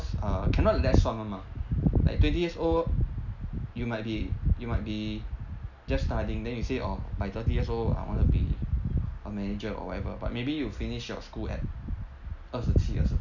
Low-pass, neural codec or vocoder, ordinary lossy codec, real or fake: 7.2 kHz; none; none; real